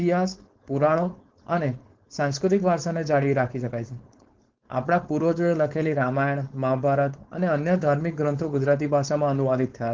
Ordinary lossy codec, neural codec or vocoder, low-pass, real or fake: Opus, 16 kbps; codec, 16 kHz, 4.8 kbps, FACodec; 7.2 kHz; fake